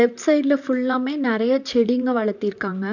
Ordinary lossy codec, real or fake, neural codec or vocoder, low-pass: none; fake; vocoder, 44.1 kHz, 128 mel bands, Pupu-Vocoder; 7.2 kHz